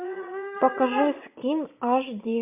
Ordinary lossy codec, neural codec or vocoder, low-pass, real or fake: MP3, 32 kbps; vocoder, 22.05 kHz, 80 mel bands, Vocos; 3.6 kHz; fake